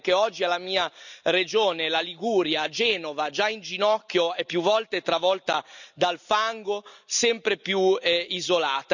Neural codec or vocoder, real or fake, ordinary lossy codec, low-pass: none; real; none; 7.2 kHz